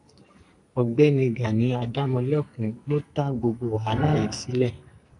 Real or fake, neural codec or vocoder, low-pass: fake; codec, 44.1 kHz, 2.6 kbps, SNAC; 10.8 kHz